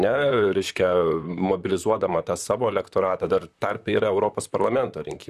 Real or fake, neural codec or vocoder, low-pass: fake; vocoder, 44.1 kHz, 128 mel bands, Pupu-Vocoder; 14.4 kHz